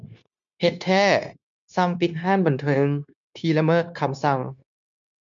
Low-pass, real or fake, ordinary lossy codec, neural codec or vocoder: 7.2 kHz; fake; MP3, 64 kbps; codec, 16 kHz, 0.9 kbps, LongCat-Audio-Codec